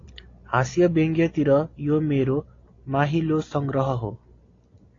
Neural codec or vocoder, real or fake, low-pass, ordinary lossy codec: none; real; 7.2 kHz; AAC, 32 kbps